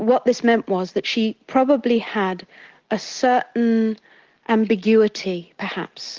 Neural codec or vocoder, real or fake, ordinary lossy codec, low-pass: none; real; Opus, 16 kbps; 7.2 kHz